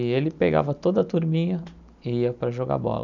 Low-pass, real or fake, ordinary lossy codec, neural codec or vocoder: 7.2 kHz; real; none; none